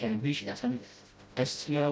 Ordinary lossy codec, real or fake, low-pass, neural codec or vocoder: none; fake; none; codec, 16 kHz, 0.5 kbps, FreqCodec, smaller model